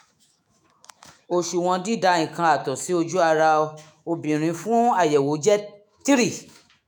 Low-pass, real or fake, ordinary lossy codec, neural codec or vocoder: none; fake; none; autoencoder, 48 kHz, 128 numbers a frame, DAC-VAE, trained on Japanese speech